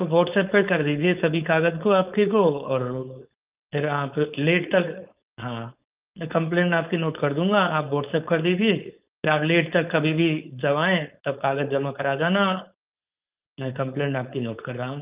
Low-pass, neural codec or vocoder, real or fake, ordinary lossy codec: 3.6 kHz; codec, 16 kHz, 4.8 kbps, FACodec; fake; Opus, 24 kbps